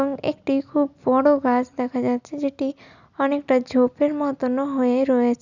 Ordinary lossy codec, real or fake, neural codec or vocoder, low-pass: none; real; none; 7.2 kHz